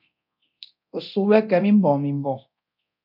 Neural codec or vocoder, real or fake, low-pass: codec, 24 kHz, 0.9 kbps, DualCodec; fake; 5.4 kHz